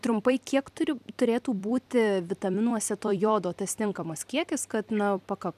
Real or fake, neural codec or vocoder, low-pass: fake; vocoder, 44.1 kHz, 128 mel bands every 256 samples, BigVGAN v2; 14.4 kHz